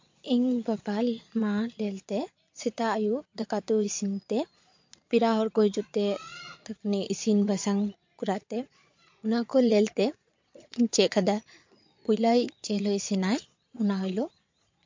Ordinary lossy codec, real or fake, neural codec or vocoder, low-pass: MP3, 48 kbps; fake; vocoder, 22.05 kHz, 80 mel bands, Vocos; 7.2 kHz